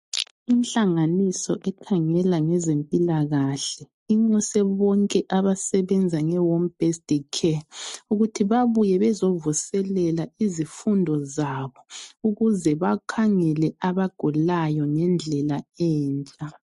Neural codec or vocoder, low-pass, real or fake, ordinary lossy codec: none; 14.4 kHz; real; MP3, 48 kbps